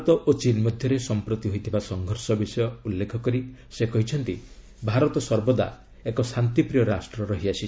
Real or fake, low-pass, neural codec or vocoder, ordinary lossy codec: real; none; none; none